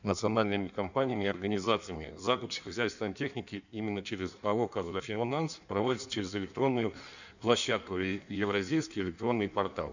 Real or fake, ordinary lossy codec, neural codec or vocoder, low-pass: fake; none; codec, 16 kHz in and 24 kHz out, 1.1 kbps, FireRedTTS-2 codec; 7.2 kHz